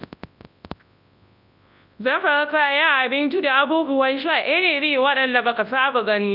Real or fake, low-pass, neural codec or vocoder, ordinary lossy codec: fake; 5.4 kHz; codec, 24 kHz, 0.9 kbps, WavTokenizer, large speech release; none